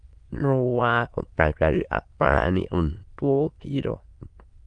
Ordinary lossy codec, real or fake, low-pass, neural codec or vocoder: Opus, 32 kbps; fake; 9.9 kHz; autoencoder, 22.05 kHz, a latent of 192 numbers a frame, VITS, trained on many speakers